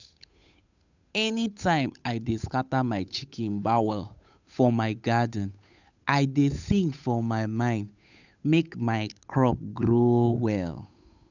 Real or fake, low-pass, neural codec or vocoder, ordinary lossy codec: fake; 7.2 kHz; codec, 16 kHz, 8 kbps, FunCodec, trained on Chinese and English, 25 frames a second; none